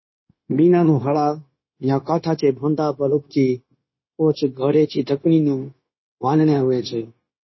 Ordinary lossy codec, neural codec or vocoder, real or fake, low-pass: MP3, 24 kbps; codec, 16 kHz, 0.9 kbps, LongCat-Audio-Codec; fake; 7.2 kHz